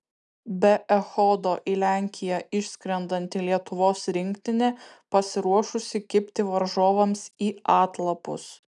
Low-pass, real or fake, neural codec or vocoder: 10.8 kHz; real; none